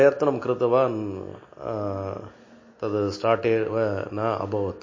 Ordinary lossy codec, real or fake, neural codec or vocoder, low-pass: MP3, 32 kbps; real; none; 7.2 kHz